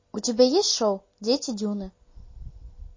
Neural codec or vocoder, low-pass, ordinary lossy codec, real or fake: none; 7.2 kHz; MP3, 32 kbps; real